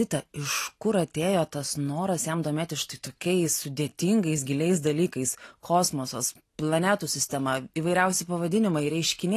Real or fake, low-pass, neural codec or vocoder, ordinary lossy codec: real; 14.4 kHz; none; AAC, 48 kbps